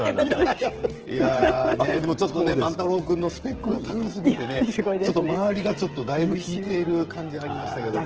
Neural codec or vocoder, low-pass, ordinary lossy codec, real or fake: codec, 16 kHz, 16 kbps, FreqCodec, smaller model; 7.2 kHz; Opus, 16 kbps; fake